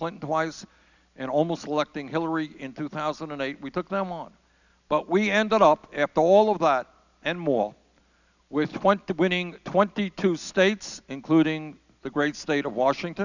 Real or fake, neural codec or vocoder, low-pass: real; none; 7.2 kHz